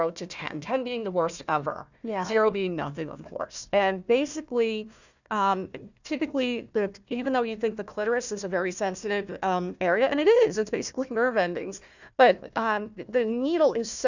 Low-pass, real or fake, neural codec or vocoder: 7.2 kHz; fake; codec, 16 kHz, 1 kbps, FunCodec, trained on Chinese and English, 50 frames a second